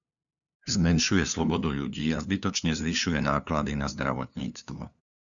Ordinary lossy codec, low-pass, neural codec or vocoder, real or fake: MP3, 96 kbps; 7.2 kHz; codec, 16 kHz, 2 kbps, FunCodec, trained on LibriTTS, 25 frames a second; fake